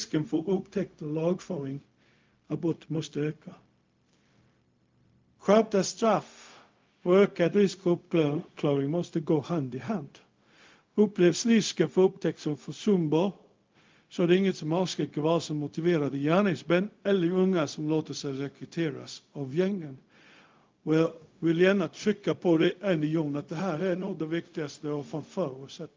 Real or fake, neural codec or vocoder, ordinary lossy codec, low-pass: fake; codec, 16 kHz, 0.4 kbps, LongCat-Audio-Codec; Opus, 32 kbps; 7.2 kHz